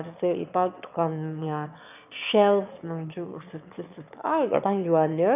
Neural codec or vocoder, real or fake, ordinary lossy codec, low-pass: autoencoder, 22.05 kHz, a latent of 192 numbers a frame, VITS, trained on one speaker; fake; none; 3.6 kHz